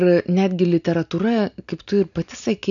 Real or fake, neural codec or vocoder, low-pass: real; none; 7.2 kHz